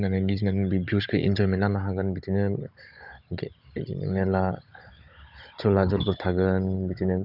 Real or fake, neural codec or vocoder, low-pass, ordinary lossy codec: fake; codec, 16 kHz, 16 kbps, FunCodec, trained on Chinese and English, 50 frames a second; 5.4 kHz; none